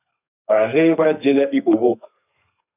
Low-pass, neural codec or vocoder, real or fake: 3.6 kHz; codec, 32 kHz, 1.9 kbps, SNAC; fake